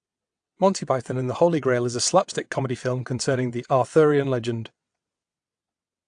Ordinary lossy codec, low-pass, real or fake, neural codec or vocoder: AAC, 64 kbps; 9.9 kHz; fake; vocoder, 22.05 kHz, 80 mel bands, WaveNeXt